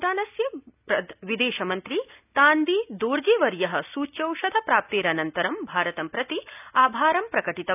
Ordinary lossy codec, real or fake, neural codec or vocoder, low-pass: none; real; none; 3.6 kHz